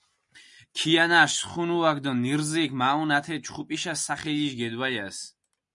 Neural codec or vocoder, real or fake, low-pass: none; real; 10.8 kHz